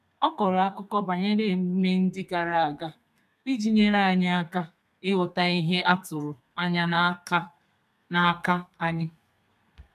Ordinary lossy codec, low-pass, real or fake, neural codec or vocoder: none; 14.4 kHz; fake; codec, 32 kHz, 1.9 kbps, SNAC